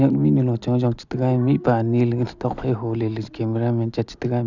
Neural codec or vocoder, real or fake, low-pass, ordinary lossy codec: none; real; 7.2 kHz; none